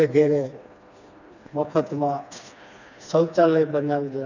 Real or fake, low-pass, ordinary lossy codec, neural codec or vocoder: fake; 7.2 kHz; none; codec, 16 kHz, 2 kbps, FreqCodec, smaller model